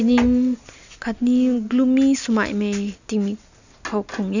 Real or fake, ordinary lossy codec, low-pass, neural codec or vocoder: real; none; 7.2 kHz; none